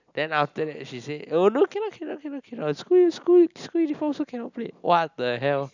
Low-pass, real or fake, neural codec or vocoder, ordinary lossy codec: 7.2 kHz; real; none; none